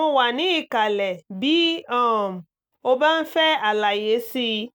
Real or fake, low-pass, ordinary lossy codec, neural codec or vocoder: real; none; none; none